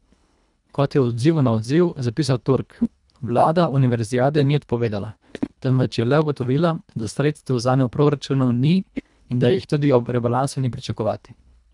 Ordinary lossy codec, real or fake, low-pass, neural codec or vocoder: none; fake; 10.8 kHz; codec, 24 kHz, 1.5 kbps, HILCodec